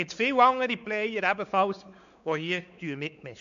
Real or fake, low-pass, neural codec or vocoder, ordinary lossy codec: fake; 7.2 kHz; codec, 16 kHz, 4 kbps, X-Codec, WavLM features, trained on Multilingual LibriSpeech; none